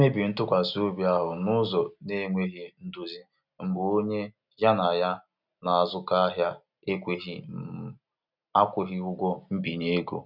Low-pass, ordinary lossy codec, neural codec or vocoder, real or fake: 5.4 kHz; none; none; real